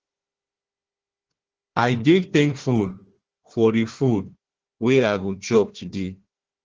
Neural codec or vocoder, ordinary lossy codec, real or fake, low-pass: codec, 16 kHz, 1 kbps, FunCodec, trained on Chinese and English, 50 frames a second; Opus, 16 kbps; fake; 7.2 kHz